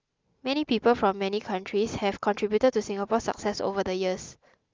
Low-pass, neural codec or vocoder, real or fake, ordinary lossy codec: 7.2 kHz; none; real; Opus, 24 kbps